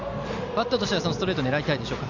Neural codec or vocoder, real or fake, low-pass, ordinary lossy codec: none; real; 7.2 kHz; none